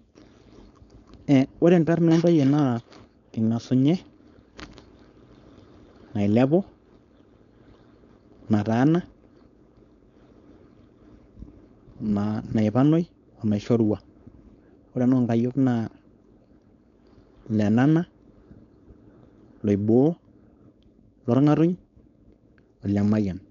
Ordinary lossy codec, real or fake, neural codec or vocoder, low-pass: none; fake; codec, 16 kHz, 4.8 kbps, FACodec; 7.2 kHz